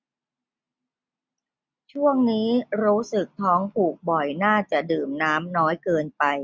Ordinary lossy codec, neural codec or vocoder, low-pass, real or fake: none; none; none; real